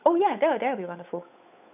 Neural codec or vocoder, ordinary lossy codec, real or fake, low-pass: codec, 16 kHz, 8 kbps, FunCodec, trained on Chinese and English, 25 frames a second; none; fake; 3.6 kHz